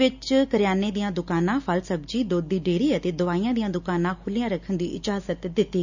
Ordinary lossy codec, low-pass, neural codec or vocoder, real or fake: none; 7.2 kHz; none; real